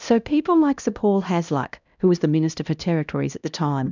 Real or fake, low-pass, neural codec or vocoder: fake; 7.2 kHz; codec, 16 kHz, 1 kbps, X-Codec, HuBERT features, trained on LibriSpeech